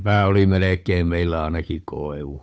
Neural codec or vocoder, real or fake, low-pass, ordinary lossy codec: codec, 16 kHz, 8 kbps, FunCodec, trained on Chinese and English, 25 frames a second; fake; none; none